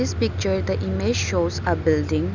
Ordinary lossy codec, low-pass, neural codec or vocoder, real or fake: none; 7.2 kHz; none; real